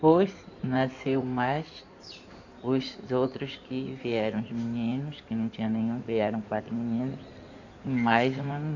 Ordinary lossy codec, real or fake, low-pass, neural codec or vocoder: none; fake; 7.2 kHz; codec, 16 kHz in and 24 kHz out, 2.2 kbps, FireRedTTS-2 codec